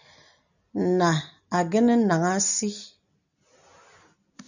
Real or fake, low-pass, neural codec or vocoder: real; 7.2 kHz; none